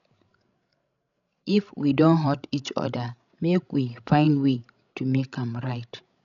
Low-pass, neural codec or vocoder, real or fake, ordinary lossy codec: 7.2 kHz; codec, 16 kHz, 16 kbps, FreqCodec, larger model; fake; none